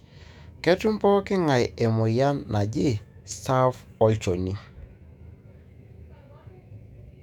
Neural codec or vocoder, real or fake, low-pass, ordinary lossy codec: autoencoder, 48 kHz, 128 numbers a frame, DAC-VAE, trained on Japanese speech; fake; 19.8 kHz; none